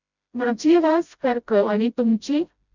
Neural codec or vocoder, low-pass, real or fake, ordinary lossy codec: codec, 16 kHz, 0.5 kbps, FreqCodec, smaller model; 7.2 kHz; fake; none